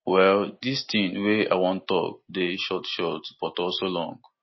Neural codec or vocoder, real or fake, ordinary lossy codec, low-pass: none; real; MP3, 24 kbps; 7.2 kHz